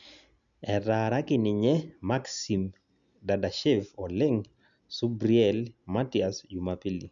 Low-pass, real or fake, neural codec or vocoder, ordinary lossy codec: 7.2 kHz; real; none; none